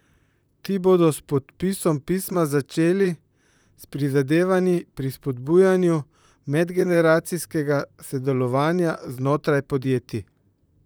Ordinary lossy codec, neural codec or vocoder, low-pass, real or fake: none; vocoder, 44.1 kHz, 128 mel bands, Pupu-Vocoder; none; fake